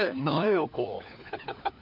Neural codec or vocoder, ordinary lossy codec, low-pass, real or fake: codec, 16 kHz, 4 kbps, FunCodec, trained on LibriTTS, 50 frames a second; none; 5.4 kHz; fake